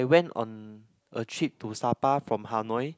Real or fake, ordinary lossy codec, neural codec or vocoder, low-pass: real; none; none; none